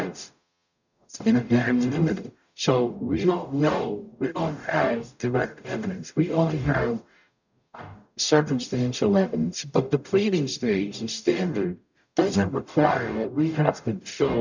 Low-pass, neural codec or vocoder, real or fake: 7.2 kHz; codec, 44.1 kHz, 0.9 kbps, DAC; fake